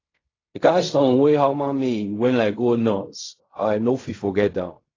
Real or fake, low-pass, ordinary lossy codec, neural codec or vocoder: fake; 7.2 kHz; AAC, 32 kbps; codec, 16 kHz in and 24 kHz out, 0.4 kbps, LongCat-Audio-Codec, fine tuned four codebook decoder